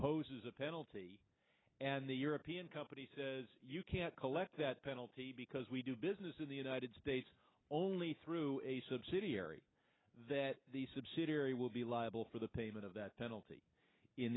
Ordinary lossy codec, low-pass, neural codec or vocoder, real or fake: AAC, 16 kbps; 7.2 kHz; none; real